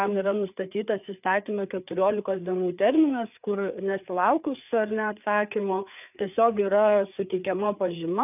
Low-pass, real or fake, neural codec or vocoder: 3.6 kHz; fake; codec, 16 kHz, 4 kbps, FreqCodec, larger model